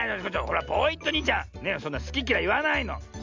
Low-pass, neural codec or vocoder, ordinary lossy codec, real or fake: 7.2 kHz; none; MP3, 64 kbps; real